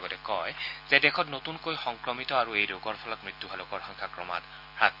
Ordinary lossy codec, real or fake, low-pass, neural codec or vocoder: none; real; 5.4 kHz; none